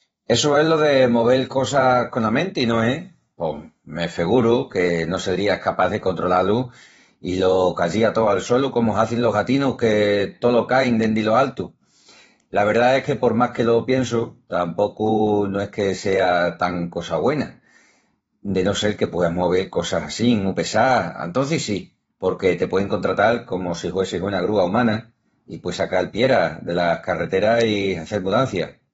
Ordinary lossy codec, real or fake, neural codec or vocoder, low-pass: AAC, 24 kbps; fake; vocoder, 44.1 kHz, 128 mel bands every 512 samples, BigVGAN v2; 19.8 kHz